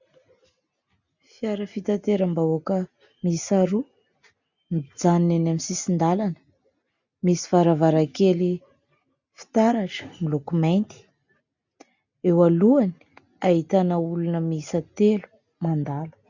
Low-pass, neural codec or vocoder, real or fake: 7.2 kHz; none; real